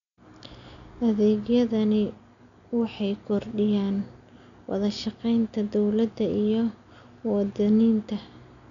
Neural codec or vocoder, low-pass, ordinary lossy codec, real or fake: none; 7.2 kHz; none; real